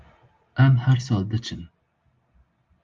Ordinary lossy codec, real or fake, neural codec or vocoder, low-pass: Opus, 32 kbps; real; none; 7.2 kHz